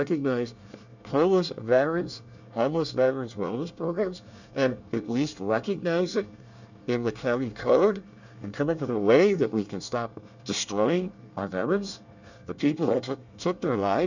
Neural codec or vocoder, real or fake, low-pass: codec, 24 kHz, 1 kbps, SNAC; fake; 7.2 kHz